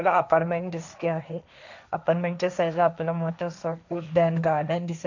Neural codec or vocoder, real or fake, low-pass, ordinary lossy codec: codec, 16 kHz, 1.1 kbps, Voila-Tokenizer; fake; 7.2 kHz; none